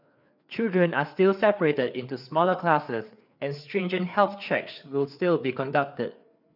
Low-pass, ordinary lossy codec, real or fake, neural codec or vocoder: 5.4 kHz; none; fake; codec, 16 kHz, 4 kbps, FreqCodec, larger model